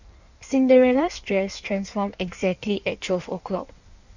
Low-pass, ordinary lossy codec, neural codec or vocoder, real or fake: 7.2 kHz; none; codec, 16 kHz in and 24 kHz out, 1.1 kbps, FireRedTTS-2 codec; fake